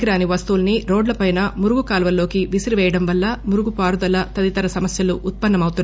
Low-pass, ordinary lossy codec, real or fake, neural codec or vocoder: none; none; real; none